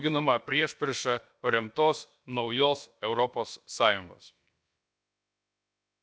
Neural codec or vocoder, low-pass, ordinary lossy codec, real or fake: codec, 16 kHz, about 1 kbps, DyCAST, with the encoder's durations; none; none; fake